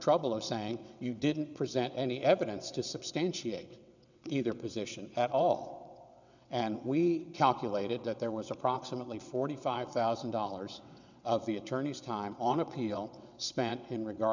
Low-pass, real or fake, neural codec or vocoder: 7.2 kHz; fake; vocoder, 22.05 kHz, 80 mel bands, WaveNeXt